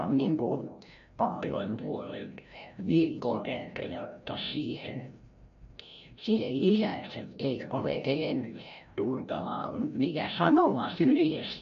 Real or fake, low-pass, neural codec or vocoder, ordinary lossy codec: fake; 7.2 kHz; codec, 16 kHz, 0.5 kbps, FreqCodec, larger model; none